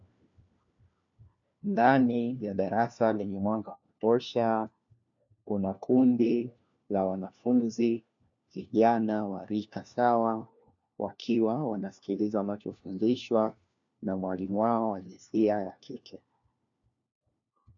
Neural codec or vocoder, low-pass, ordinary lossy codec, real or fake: codec, 16 kHz, 1 kbps, FunCodec, trained on LibriTTS, 50 frames a second; 7.2 kHz; MP3, 64 kbps; fake